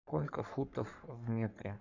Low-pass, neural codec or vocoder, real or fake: 7.2 kHz; codec, 44.1 kHz, 3.4 kbps, Pupu-Codec; fake